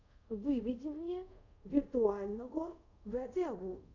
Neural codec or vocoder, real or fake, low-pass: codec, 24 kHz, 0.5 kbps, DualCodec; fake; 7.2 kHz